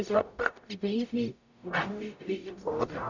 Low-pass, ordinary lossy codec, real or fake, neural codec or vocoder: 7.2 kHz; none; fake; codec, 44.1 kHz, 0.9 kbps, DAC